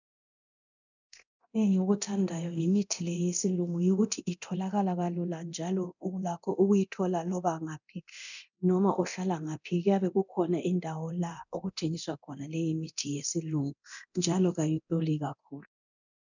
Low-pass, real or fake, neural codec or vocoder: 7.2 kHz; fake; codec, 24 kHz, 0.9 kbps, DualCodec